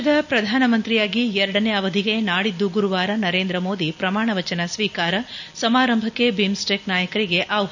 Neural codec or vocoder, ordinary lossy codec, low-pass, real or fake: none; none; 7.2 kHz; real